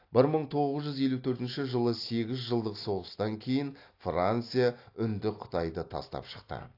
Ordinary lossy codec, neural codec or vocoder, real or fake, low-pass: AAC, 32 kbps; none; real; 5.4 kHz